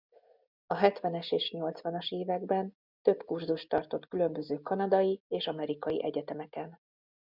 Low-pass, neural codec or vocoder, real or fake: 5.4 kHz; none; real